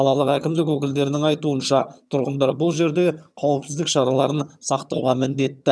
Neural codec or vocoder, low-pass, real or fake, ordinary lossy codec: vocoder, 22.05 kHz, 80 mel bands, HiFi-GAN; none; fake; none